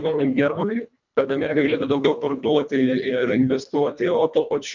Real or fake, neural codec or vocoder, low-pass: fake; codec, 24 kHz, 1.5 kbps, HILCodec; 7.2 kHz